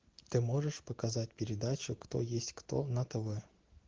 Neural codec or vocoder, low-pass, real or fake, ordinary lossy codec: none; 7.2 kHz; real; Opus, 16 kbps